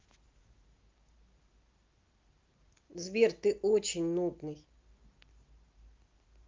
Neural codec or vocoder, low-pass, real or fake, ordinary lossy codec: none; 7.2 kHz; real; Opus, 24 kbps